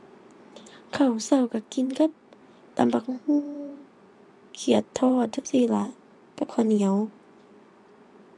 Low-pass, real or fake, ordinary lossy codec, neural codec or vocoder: none; fake; none; vocoder, 24 kHz, 100 mel bands, Vocos